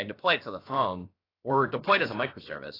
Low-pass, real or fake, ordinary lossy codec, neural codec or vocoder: 5.4 kHz; fake; AAC, 24 kbps; codec, 16 kHz, about 1 kbps, DyCAST, with the encoder's durations